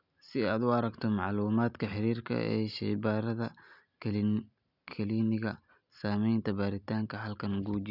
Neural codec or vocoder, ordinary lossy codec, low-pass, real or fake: none; none; 5.4 kHz; real